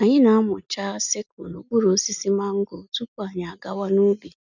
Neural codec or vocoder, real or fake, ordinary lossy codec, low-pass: none; real; none; 7.2 kHz